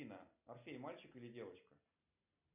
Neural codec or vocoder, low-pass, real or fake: none; 3.6 kHz; real